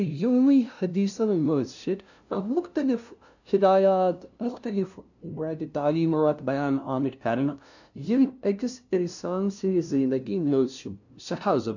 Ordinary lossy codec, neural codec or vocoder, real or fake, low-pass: none; codec, 16 kHz, 0.5 kbps, FunCodec, trained on LibriTTS, 25 frames a second; fake; 7.2 kHz